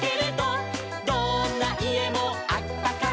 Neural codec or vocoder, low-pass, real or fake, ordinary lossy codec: none; none; real; none